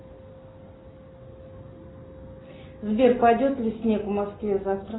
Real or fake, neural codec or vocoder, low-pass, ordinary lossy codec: real; none; 7.2 kHz; AAC, 16 kbps